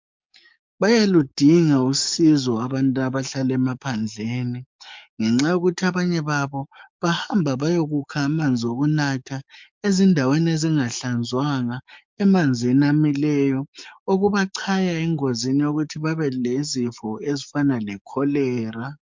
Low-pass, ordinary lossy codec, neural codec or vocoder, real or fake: 7.2 kHz; MP3, 64 kbps; codec, 44.1 kHz, 7.8 kbps, DAC; fake